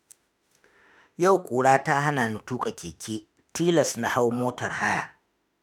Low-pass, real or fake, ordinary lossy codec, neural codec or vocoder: none; fake; none; autoencoder, 48 kHz, 32 numbers a frame, DAC-VAE, trained on Japanese speech